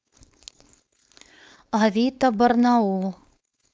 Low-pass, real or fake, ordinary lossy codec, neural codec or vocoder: none; fake; none; codec, 16 kHz, 4.8 kbps, FACodec